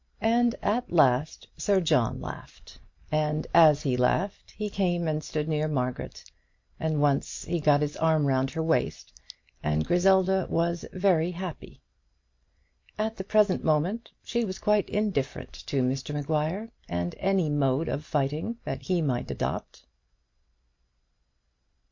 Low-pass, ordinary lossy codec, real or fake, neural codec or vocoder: 7.2 kHz; MP3, 48 kbps; real; none